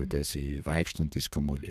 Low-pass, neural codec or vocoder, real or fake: 14.4 kHz; codec, 32 kHz, 1.9 kbps, SNAC; fake